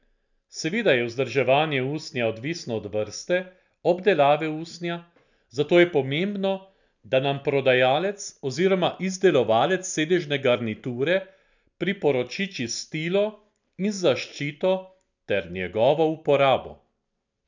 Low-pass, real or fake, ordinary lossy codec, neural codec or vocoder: 7.2 kHz; real; none; none